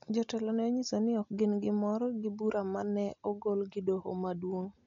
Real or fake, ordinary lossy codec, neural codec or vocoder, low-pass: real; MP3, 64 kbps; none; 7.2 kHz